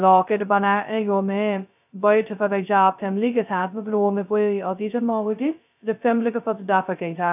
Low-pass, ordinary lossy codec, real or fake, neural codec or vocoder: 3.6 kHz; none; fake; codec, 16 kHz, 0.2 kbps, FocalCodec